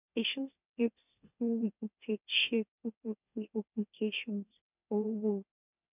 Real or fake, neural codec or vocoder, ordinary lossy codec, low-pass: fake; autoencoder, 44.1 kHz, a latent of 192 numbers a frame, MeloTTS; none; 3.6 kHz